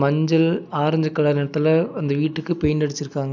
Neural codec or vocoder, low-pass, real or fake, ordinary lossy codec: none; 7.2 kHz; real; none